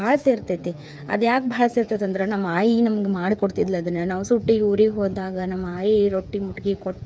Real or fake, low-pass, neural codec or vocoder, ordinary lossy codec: fake; none; codec, 16 kHz, 8 kbps, FreqCodec, smaller model; none